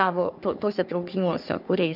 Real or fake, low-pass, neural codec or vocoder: fake; 5.4 kHz; codec, 44.1 kHz, 3.4 kbps, Pupu-Codec